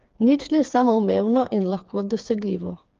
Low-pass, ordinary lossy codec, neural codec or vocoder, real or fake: 7.2 kHz; Opus, 32 kbps; codec, 16 kHz, 4 kbps, FreqCodec, smaller model; fake